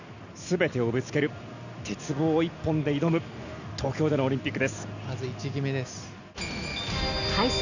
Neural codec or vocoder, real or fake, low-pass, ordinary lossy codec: none; real; 7.2 kHz; none